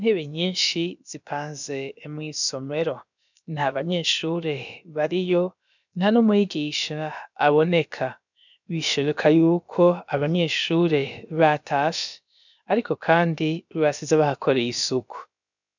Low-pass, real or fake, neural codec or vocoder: 7.2 kHz; fake; codec, 16 kHz, about 1 kbps, DyCAST, with the encoder's durations